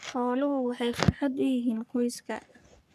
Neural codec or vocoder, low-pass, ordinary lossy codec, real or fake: codec, 44.1 kHz, 3.4 kbps, Pupu-Codec; 14.4 kHz; none; fake